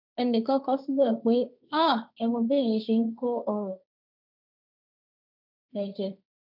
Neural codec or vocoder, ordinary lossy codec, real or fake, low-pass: codec, 16 kHz, 1.1 kbps, Voila-Tokenizer; none; fake; 5.4 kHz